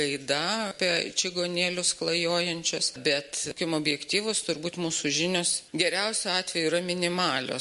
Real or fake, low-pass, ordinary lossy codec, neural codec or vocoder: real; 14.4 kHz; MP3, 48 kbps; none